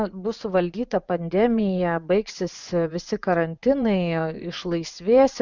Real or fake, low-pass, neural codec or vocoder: fake; 7.2 kHz; vocoder, 24 kHz, 100 mel bands, Vocos